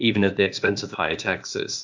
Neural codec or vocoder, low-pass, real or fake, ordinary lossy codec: codec, 16 kHz, 0.8 kbps, ZipCodec; 7.2 kHz; fake; MP3, 64 kbps